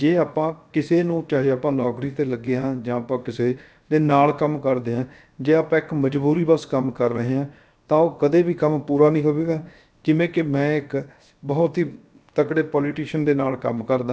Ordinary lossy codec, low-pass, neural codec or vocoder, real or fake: none; none; codec, 16 kHz, 0.7 kbps, FocalCodec; fake